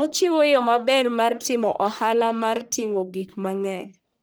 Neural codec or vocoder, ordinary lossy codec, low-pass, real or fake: codec, 44.1 kHz, 1.7 kbps, Pupu-Codec; none; none; fake